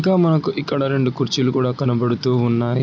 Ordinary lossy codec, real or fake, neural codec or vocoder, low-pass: none; real; none; none